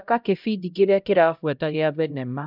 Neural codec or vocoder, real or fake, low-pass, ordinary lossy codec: codec, 16 kHz, 0.5 kbps, X-Codec, HuBERT features, trained on LibriSpeech; fake; 5.4 kHz; none